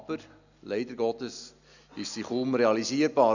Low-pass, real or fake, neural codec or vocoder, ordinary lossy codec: 7.2 kHz; real; none; none